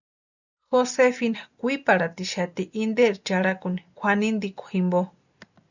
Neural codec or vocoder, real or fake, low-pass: none; real; 7.2 kHz